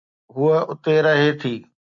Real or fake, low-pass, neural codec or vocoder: real; 7.2 kHz; none